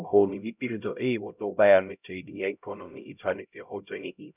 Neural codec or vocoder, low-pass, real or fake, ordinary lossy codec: codec, 16 kHz, 0.5 kbps, X-Codec, HuBERT features, trained on LibriSpeech; 3.6 kHz; fake; none